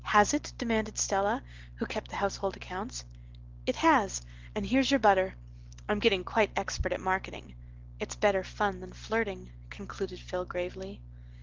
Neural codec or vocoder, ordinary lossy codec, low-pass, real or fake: none; Opus, 16 kbps; 7.2 kHz; real